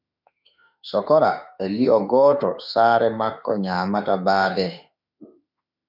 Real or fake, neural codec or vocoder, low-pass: fake; autoencoder, 48 kHz, 32 numbers a frame, DAC-VAE, trained on Japanese speech; 5.4 kHz